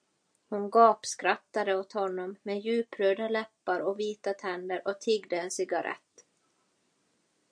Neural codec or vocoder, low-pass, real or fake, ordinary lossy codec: none; 9.9 kHz; real; MP3, 64 kbps